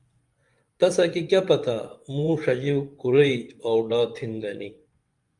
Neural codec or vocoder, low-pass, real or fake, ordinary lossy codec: vocoder, 24 kHz, 100 mel bands, Vocos; 10.8 kHz; fake; Opus, 32 kbps